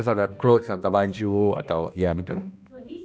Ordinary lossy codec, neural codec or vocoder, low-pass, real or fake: none; codec, 16 kHz, 1 kbps, X-Codec, HuBERT features, trained on balanced general audio; none; fake